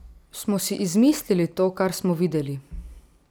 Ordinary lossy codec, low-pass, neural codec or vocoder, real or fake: none; none; none; real